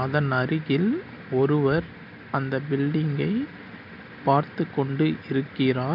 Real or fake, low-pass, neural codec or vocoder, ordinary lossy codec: real; 5.4 kHz; none; none